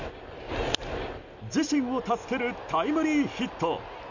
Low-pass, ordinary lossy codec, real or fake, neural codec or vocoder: 7.2 kHz; none; real; none